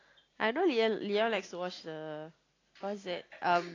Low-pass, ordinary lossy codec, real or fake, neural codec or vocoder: 7.2 kHz; AAC, 32 kbps; real; none